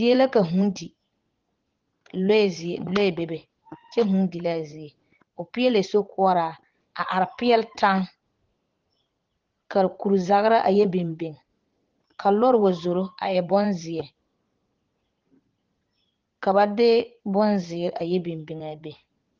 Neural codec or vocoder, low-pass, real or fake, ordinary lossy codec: vocoder, 44.1 kHz, 80 mel bands, Vocos; 7.2 kHz; fake; Opus, 16 kbps